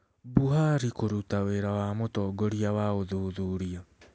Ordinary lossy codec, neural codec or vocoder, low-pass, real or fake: none; none; none; real